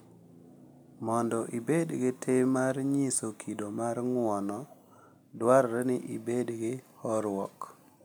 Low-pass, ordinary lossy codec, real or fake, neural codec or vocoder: none; none; real; none